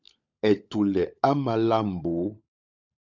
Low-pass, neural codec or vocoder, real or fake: 7.2 kHz; codec, 16 kHz, 16 kbps, FunCodec, trained on LibriTTS, 50 frames a second; fake